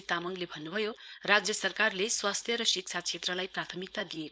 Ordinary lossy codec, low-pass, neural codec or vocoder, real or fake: none; none; codec, 16 kHz, 4.8 kbps, FACodec; fake